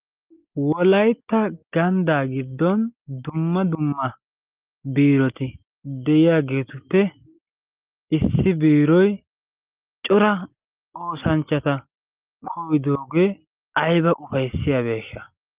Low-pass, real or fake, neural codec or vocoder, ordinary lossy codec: 3.6 kHz; real; none; Opus, 32 kbps